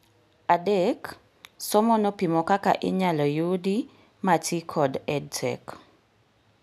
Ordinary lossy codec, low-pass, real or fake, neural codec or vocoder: none; 14.4 kHz; real; none